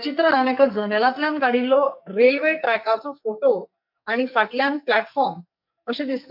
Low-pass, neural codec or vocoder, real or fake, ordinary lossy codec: 5.4 kHz; codec, 44.1 kHz, 2.6 kbps, SNAC; fake; none